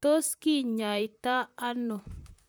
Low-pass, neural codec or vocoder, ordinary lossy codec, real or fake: none; vocoder, 44.1 kHz, 128 mel bands, Pupu-Vocoder; none; fake